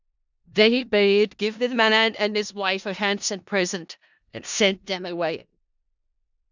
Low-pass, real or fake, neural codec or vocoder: 7.2 kHz; fake; codec, 16 kHz in and 24 kHz out, 0.4 kbps, LongCat-Audio-Codec, four codebook decoder